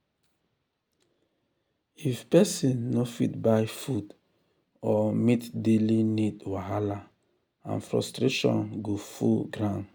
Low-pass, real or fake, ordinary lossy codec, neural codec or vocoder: none; real; none; none